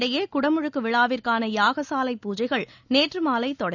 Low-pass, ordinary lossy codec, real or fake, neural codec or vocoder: 7.2 kHz; none; real; none